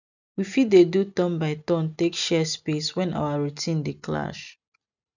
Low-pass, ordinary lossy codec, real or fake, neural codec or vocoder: 7.2 kHz; none; real; none